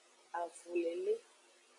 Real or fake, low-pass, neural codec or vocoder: real; 10.8 kHz; none